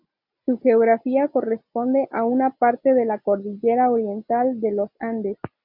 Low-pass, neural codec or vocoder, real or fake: 5.4 kHz; none; real